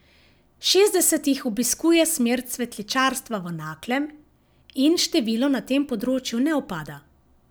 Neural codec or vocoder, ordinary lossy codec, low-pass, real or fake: none; none; none; real